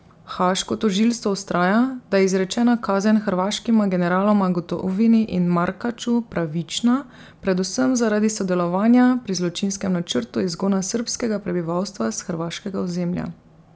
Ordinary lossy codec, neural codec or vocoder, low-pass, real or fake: none; none; none; real